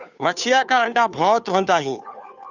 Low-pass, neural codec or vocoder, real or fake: 7.2 kHz; codec, 16 kHz, 2 kbps, FunCodec, trained on Chinese and English, 25 frames a second; fake